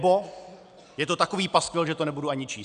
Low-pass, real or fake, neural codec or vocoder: 9.9 kHz; real; none